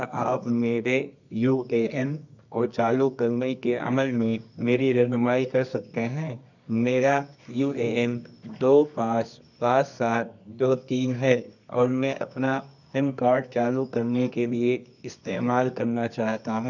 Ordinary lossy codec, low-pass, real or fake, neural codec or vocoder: none; 7.2 kHz; fake; codec, 24 kHz, 0.9 kbps, WavTokenizer, medium music audio release